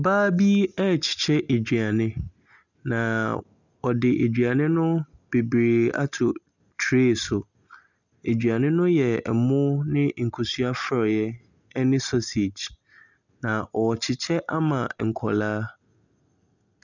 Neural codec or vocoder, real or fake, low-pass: none; real; 7.2 kHz